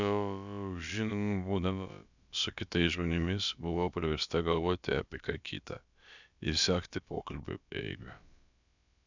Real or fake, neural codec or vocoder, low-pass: fake; codec, 16 kHz, about 1 kbps, DyCAST, with the encoder's durations; 7.2 kHz